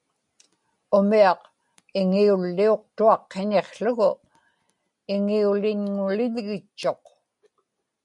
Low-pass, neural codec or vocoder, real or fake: 10.8 kHz; none; real